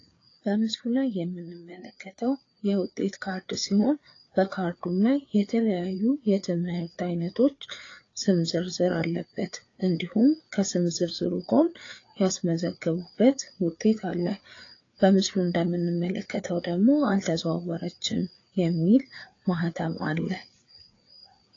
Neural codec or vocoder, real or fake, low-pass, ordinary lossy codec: codec, 16 kHz, 4 kbps, FreqCodec, larger model; fake; 7.2 kHz; AAC, 32 kbps